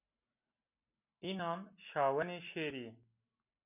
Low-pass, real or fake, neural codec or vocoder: 3.6 kHz; real; none